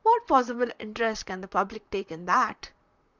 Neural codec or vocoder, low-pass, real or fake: vocoder, 44.1 kHz, 128 mel bands, Pupu-Vocoder; 7.2 kHz; fake